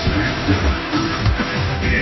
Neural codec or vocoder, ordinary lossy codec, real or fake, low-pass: codec, 16 kHz, 0.5 kbps, FunCodec, trained on Chinese and English, 25 frames a second; MP3, 24 kbps; fake; 7.2 kHz